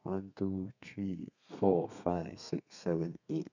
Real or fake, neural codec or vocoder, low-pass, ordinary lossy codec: fake; codec, 32 kHz, 1.9 kbps, SNAC; 7.2 kHz; none